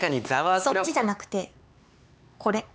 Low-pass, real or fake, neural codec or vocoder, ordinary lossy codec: none; fake; codec, 16 kHz, 4 kbps, X-Codec, HuBERT features, trained on LibriSpeech; none